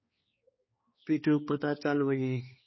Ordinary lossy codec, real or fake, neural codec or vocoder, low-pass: MP3, 24 kbps; fake; codec, 16 kHz, 2 kbps, X-Codec, HuBERT features, trained on balanced general audio; 7.2 kHz